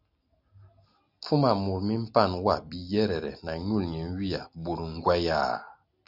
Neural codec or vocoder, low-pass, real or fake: none; 5.4 kHz; real